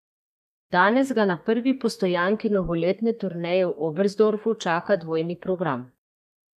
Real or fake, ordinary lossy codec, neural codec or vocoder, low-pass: fake; none; codec, 32 kHz, 1.9 kbps, SNAC; 14.4 kHz